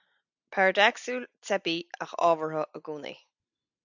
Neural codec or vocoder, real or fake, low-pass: none; real; 7.2 kHz